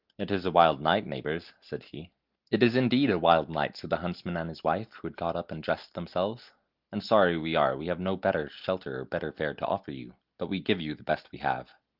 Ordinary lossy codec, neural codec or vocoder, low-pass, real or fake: Opus, 24 kbps; none; 5.4 kHz; real